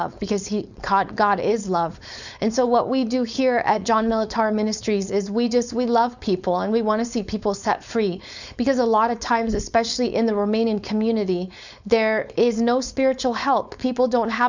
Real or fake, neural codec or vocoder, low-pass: fake; codec, 16 kHz, 4.8 kbps, FACodec; 7.2 kHz